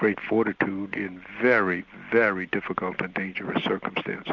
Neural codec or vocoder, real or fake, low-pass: none; real; 7.2 kHz